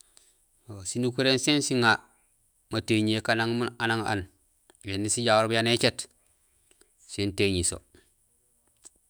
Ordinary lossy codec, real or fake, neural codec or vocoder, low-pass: none; fake; autoencoder, 48 kHz, 128 numbers a frame, DAC-VAE, trained on Japanese speech; none